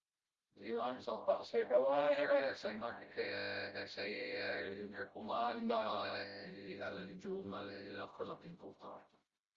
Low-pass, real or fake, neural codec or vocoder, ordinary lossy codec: 7.2 kHz; fake; codec, 16 kHz, 0.5 kbps, FreqCodec, smaller model; Opus, 32 kbps